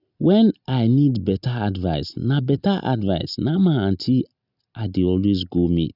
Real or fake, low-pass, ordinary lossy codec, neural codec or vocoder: real; 5.4 kHz; none; none